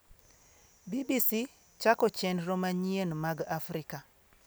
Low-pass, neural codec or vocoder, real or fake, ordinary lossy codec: none; none; real; none